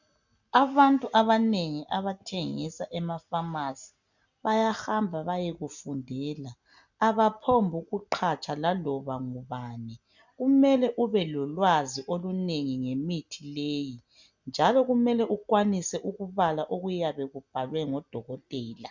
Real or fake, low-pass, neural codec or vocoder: real; 7.2 kHz; none